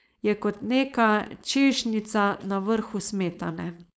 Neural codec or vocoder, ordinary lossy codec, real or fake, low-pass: codec, 16 kHz, 4.8 kbps, FACodec; none; fake; none